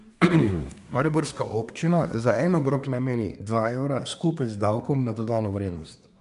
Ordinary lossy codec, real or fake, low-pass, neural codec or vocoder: none; fake; 10.8 kHz; codec, 24 kHz, 1 kbps, SNAC